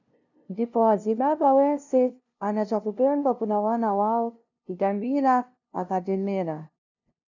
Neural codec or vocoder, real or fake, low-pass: codec, 16 kHz, 0.5 kbps, FunCodec, trained on LibriTTS, 25 frames a second; fake; 7.2 kHz